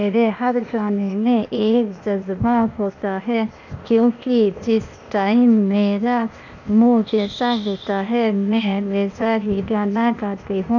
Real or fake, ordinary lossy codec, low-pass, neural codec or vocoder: fake; none; 7.2 kHz; codec, 16 kHz, 0.8 kbps, ZipCodec